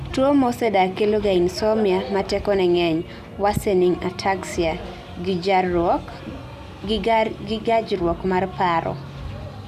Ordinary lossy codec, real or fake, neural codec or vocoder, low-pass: Opus, 64 kbps; real; none; 14.4 kHz